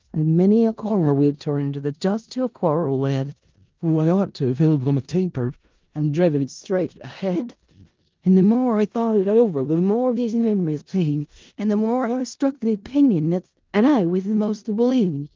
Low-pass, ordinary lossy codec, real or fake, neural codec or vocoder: 7.2 kHz; Opus, 32 kbps; fake; codec, 16 kHz in and 24 kHz out, 0.4 kbps, LongCat-Audio-Codec, four codebook decoder